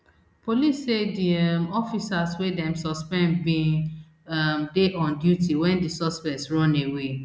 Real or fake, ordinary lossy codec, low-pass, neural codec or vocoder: real; none; none; none